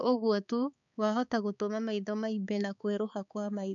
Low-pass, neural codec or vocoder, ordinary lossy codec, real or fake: 7.2 kHz; codec, 16 kHz, 4 kbps, X-Codec, HuBERT features, trained on balanced general audio; none; fake